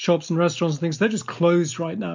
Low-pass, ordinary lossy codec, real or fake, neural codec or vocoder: 7.2 kHz; MP3, 64 kbps; real; none